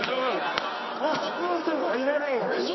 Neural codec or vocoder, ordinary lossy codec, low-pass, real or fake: codec, 24 kHz, 0.9 kbps, WavTokenizer, medium music audio release; MP3, 24 kbps; 7.2 kHz; fake